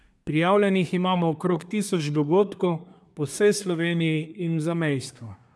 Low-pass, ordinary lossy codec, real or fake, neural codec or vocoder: none; none; fake; codec, 24 kHz, 1 kbps, SNAC